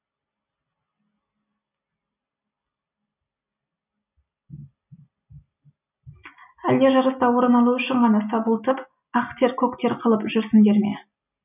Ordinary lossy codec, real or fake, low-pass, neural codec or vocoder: none; real; 3.6 kHz; none